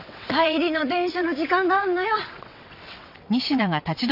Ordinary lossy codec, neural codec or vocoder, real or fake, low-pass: none; vocoder, 22.05 kHz, 80 mel bands, WaveNeXt; fake; 5.4 kHz